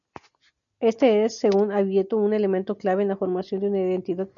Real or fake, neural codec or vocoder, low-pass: real; none; 7.2 kHz